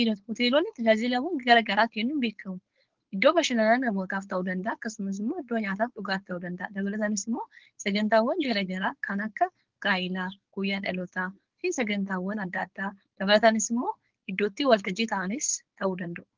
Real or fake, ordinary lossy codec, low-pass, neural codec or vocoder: fake; Opus, 16 kbps; 7.2 kHz; codec, 16 kHz, 4.8 kbps, FACodec